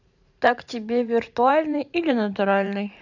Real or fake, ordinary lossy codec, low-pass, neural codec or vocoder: fake; none; 7.2 kHz; vocoder, 44.1 kHz, 128 mel bands every 512 samples, BigVGAN v2